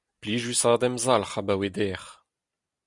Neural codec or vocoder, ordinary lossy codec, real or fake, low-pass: none; Opus, 64 kbps; real; 10.8 kHz